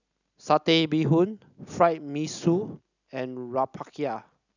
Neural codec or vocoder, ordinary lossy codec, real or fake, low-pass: none; none; real; 7.2 kHz